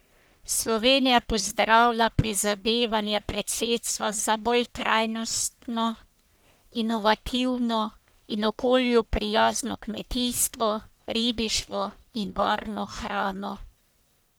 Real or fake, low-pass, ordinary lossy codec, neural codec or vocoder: fake; none; none; codec, 44.1 kHz, 1.7 kbps, Pupu-Codec